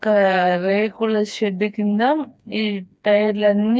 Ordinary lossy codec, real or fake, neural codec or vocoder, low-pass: none; fake; codec, 16 kHz, 2 kbps, FreqCodec, smaller model; none